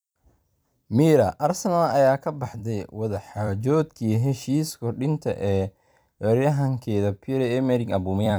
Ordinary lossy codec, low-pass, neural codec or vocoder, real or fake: none; none; vocoder, 44.1 kHz, 128 mel bands every 256 samples, BigVGAN v2; fake